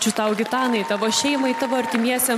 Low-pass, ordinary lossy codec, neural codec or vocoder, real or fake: 14.4 kHz; MP3, 96 kbps; none; real